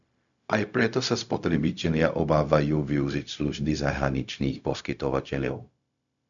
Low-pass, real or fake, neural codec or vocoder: 7.2 kHz; fake; codec, 16 kHz, 0.4 kbps, LongCat-Audio-Codec